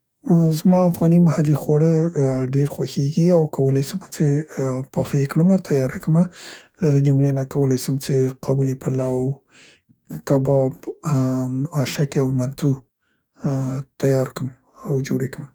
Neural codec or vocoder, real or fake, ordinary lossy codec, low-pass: codec, 44.1 kHz, 2.6 kbps, DAC; fake; none; none